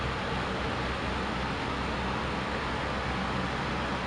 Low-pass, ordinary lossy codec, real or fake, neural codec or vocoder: 9.9 kHz; none; real; none